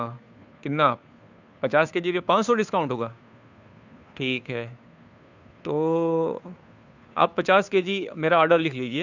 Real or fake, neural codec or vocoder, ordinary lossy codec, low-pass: fake; codec, 16 kHz, 2 kbps, FunCodec, trained on Chinese and English, 25 frames a second; none; 7.2 kHz